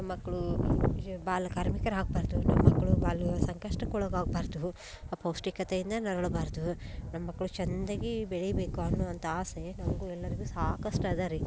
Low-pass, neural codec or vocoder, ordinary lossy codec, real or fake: none; none; none; real